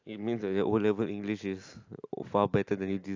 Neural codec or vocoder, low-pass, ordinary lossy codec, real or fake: none; 7.2 kHz; AAC, 48 kbps; real